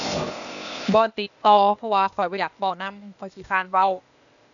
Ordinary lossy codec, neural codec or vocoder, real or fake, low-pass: none; codec, 16 kHz, 0.8 kbps, ZipCodec; fake; 7.2 kHz